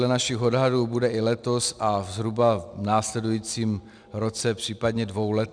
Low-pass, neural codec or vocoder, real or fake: 9.9 kHz; none; real